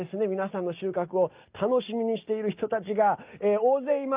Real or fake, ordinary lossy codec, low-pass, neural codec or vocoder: real; Opus, 24 kbps; 3.6 kHz; none